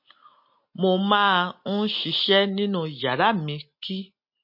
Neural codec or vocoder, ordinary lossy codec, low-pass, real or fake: none; MP3, 32 kbps; 5.4 kHz; real